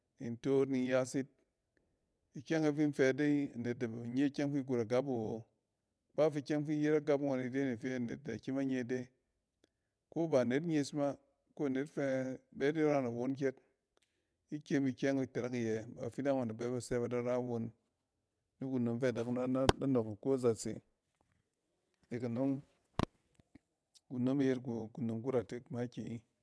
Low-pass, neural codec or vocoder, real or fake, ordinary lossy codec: none; vocoder, 22.05 kHz, 80 mel bands, WaveNeXt; fake; none